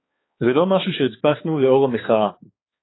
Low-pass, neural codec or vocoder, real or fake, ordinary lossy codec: 7.2 kHz; codec, 16 kHz, 4 kbps, X-Codec, HuBERT features, trained on balanced general audio; fake; AAC, 16 kbps